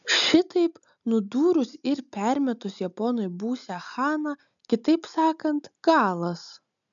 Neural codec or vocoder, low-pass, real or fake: none; 7.2 kHz; real